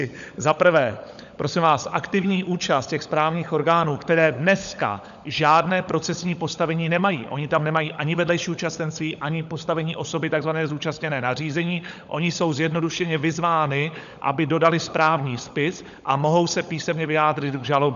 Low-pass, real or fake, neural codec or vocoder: 7.2 kHz; fake; codec, 16 kHz, 16 kbps, FunCodec, trained on LibriTTS, 50 frames a second